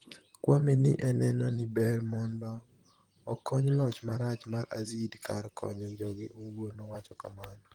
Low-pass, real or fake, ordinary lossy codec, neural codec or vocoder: 19.8 kHz; fake; Opus, 24 kbps; vocoder, 44.1 kHz, 128 mel bands, Pupu-Vocoder